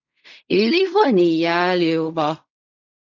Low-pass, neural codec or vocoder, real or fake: 7.2 kHz; codec, 16 kHz in and 24 kHz out, 0.4 kbps, LongCat-Audio-Codec, fine tuned four codebook decoder; fake